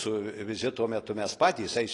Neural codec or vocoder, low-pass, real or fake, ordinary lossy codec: none; 10.8 kHz; real; AAC, 32 kbps